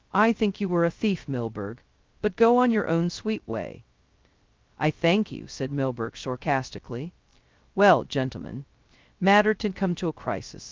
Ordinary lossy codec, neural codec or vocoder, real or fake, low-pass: Opus, 32 kbps; codec, 16 kHz, 0.2 kbps, FocalCodec; fake; 7.2 kHz